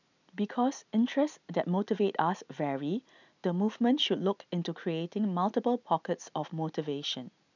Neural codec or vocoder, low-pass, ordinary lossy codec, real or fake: none; 7.2 kHz; none; real